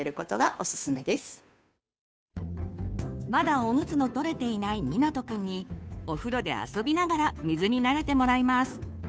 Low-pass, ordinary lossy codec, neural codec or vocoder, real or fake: none; none; codec, 16 kHz, 2 kbps, FunCodec, trained on Chinese and English, 25 frames a second; fake